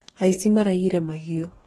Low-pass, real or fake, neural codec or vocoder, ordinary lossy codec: 19.8 kHz; fake; codec, 44.1 kHz, 2.6 kbps, DAC; AAC, 32 kbps